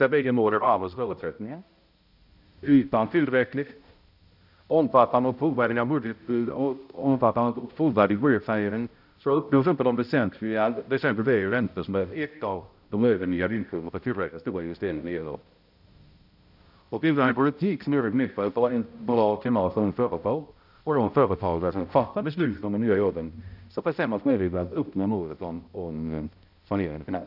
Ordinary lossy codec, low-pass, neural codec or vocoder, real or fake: none; 5.4 kHz; codec, 16 kHz, 0.5 kbps, X-Codec, HuBERT features, trained on balanced general audio; fake